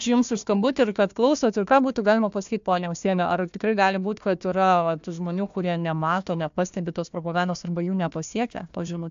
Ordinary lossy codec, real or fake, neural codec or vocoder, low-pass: AAC, 64 kbps; fake; codec, 16 kHz, 1 kbps, FunCodec, trained on Chinese and English, 50 frames a second; 7.2 kHz